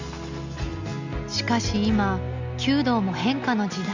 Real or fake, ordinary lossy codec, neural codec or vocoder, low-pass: real; Opus, 64 kbps; none; 7.2 kHz